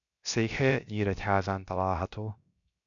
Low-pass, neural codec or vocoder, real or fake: 7.2 kHz; codec, 16 kHz, 0.7 kbps, FocalCodec; fake